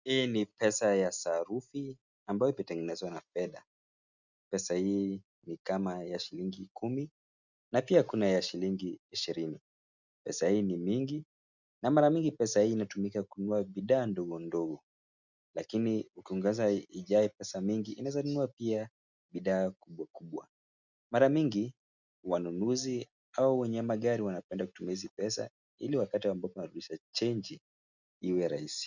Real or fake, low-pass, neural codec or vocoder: real; 7.2 kHz; none